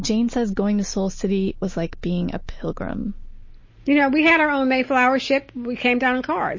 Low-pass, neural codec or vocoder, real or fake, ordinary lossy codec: 7.2 kHz; none; real; MP3, 32 kbps